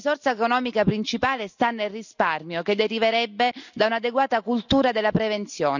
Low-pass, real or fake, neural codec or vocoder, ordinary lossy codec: 7.2 kHz; real; none; none